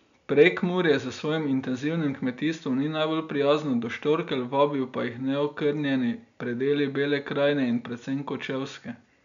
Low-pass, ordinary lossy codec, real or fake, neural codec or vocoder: 7.2 kHz; none; real; none